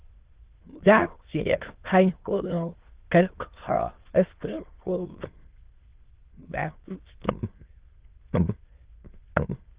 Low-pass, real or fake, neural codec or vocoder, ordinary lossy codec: 3.6 kHz; fake; autoencoder, 22.05 kHz, a latent of 192 numbers a frame, VITS, trained on many speakers; Opus, 16 kbps